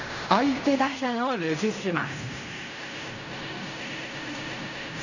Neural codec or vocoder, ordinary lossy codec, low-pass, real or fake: codec, 16 kHz in and 24 kHz out, 0.4 kbps, LongCat-Audio-Codec, fine tuned four codebook decoder; none; 7.2 kHz; fake